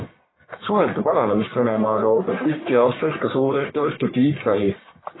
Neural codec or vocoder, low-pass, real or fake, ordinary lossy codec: codec, 44.1 kHz, 1.7 kbps, Pupu-Codec; 7.2 kHz; fake; AAC, 16 kbps